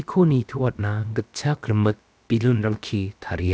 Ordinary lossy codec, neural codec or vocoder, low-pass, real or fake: none; codec, 16 kHz, about 1 kbps, DyCAST, with the encoder's durations; none; fake